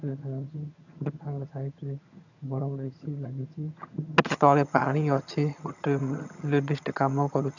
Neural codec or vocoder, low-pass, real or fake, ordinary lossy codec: vocoder, 22.05 kHz, 80 mel bands, HiFi-GAN; 7.2 kHz; fake; none